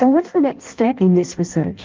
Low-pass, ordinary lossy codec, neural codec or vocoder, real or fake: 7.2 kHz; Opus, 32 kbps; codec, 16 kHz in and 24 kHz out, 0.6 kbps, FireRedTTS-2 codec; fake